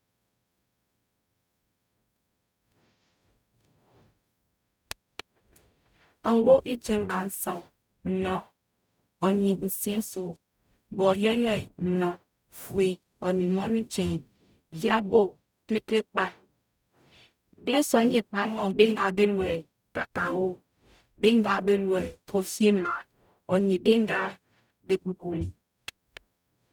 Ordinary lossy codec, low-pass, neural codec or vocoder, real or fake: none; 19.8 kHz; codec, 44.1 kHz, 0.9 kbps, DAC; fake